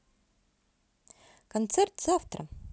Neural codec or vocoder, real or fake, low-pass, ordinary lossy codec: none; real; none; none